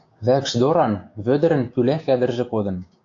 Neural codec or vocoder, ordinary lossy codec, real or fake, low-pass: codec, 16 kHz, 6 kbps, DAC; AAC, 48 kbps; fake; 7.2 kHz